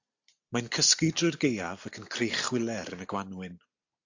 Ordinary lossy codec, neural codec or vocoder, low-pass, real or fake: AAC, 48 kbps; none; 7.2 kHz; real